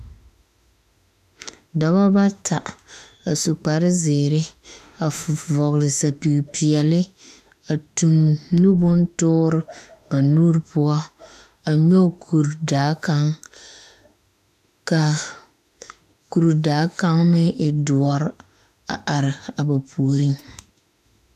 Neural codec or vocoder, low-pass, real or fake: autoencoder, 48 kHz, 32 numbers a frame, DAC-VAE, trained on Japanese speech; 14.4 kHz; fake